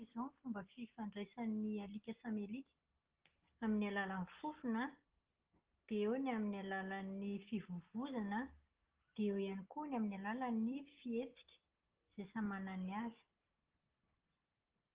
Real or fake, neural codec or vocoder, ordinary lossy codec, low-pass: real; none; Opus, 16 kbps; 3.6 kHz